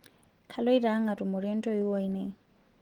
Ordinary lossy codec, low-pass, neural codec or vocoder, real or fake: Opus, 16 kbps; 19.8 kHz; none; real